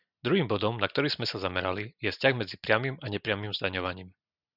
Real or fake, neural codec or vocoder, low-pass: real; none; 5.4 kHz